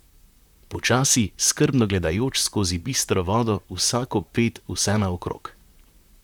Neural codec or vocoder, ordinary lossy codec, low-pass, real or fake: vocoder, 44.1 kHz, 128 mel bands, Pupu-Vocoder; none; 19.8 kHz; fake